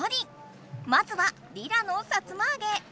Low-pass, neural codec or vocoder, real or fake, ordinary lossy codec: none; none; real; none